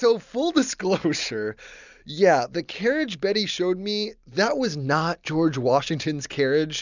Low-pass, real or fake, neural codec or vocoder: 7.2 kHz; real; none